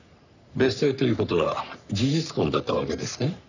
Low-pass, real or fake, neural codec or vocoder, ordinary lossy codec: 7.2 kHz; fake; codec, 44.1 kHz, 3.4 kbps, Pupu-Codec; none